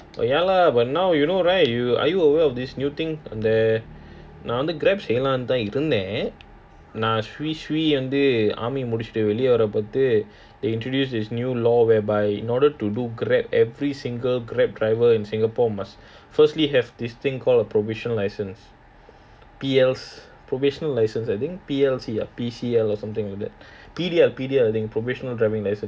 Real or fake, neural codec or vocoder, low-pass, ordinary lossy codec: real; none; none; none